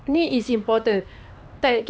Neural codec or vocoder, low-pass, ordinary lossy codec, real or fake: codec, 16 kHz, 2 kbps, X-Codec, HuBERT features, trained on LibriSpeech; none; none; fake